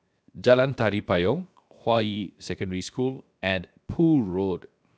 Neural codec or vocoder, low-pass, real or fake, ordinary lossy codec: codec, 16 kHz, 0.7 kbps, FocalCodec; none; fake; none